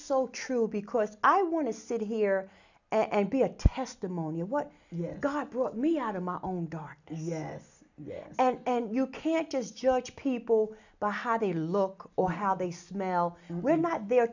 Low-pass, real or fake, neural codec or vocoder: 7.2 kHz; real; none